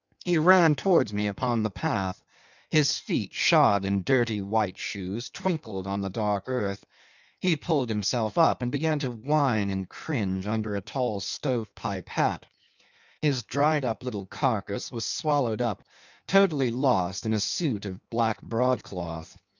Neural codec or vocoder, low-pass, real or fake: codec, 16 kHz in and 24 kHz out, 1.1 kbps, FireRedTTS-2 codec; 7.2 kHz; fake